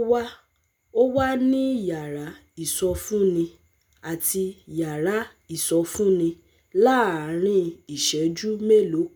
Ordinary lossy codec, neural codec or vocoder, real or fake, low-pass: none; none; real; none